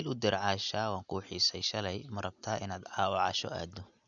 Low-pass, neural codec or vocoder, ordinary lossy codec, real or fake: 7.2 kHz; none; none; real